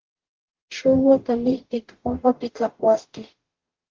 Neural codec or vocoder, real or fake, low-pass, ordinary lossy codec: codec, 44.1 kHz, 0.9 kbps, DAC; fake; 7.2 kHz; Opus, 16 kbps